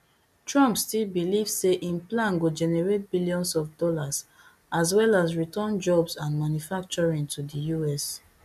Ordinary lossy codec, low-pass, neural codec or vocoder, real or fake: MP3, 96 kbps; 14.4 kHz; none; real